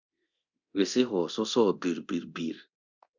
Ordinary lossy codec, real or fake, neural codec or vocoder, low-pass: Opus, 64 kbps; fake; codec, 24 kHz, 0.9 kbps, DualCodec; 7.2 kHz